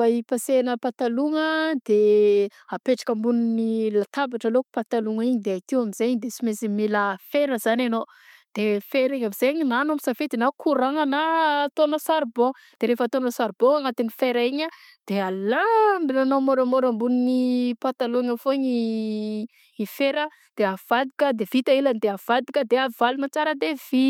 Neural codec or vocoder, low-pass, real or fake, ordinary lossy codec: none; 19.8 kHz; real; MP3, 96 kbps